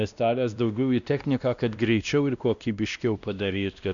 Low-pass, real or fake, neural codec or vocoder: 7.2 kHz; fake; codec, 16 kHz, 1 kbps, X-Codec, WavLM features, trained on Multilingual LibriSpeech